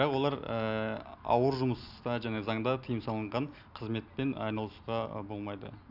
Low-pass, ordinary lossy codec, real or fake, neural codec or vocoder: 5.4 kHz; none; real; none